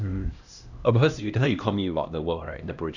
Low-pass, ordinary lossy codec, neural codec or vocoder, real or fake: 7.2 kHz; none; codec, 16 kHz, 1 kbps, X-Codec, HuBERT features, trained on LibriSpeech; fake